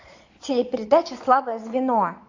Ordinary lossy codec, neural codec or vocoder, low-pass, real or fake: AAC, 48 kbps; vocoder, 22.05 kHz, 80 mel bands, Vocos; 7.2 kHz; fake